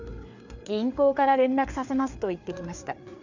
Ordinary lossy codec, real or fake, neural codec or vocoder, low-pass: none; fake; codec, 16 kHz, 4 kbps, FreqCodec, larger model; 7.2 kHz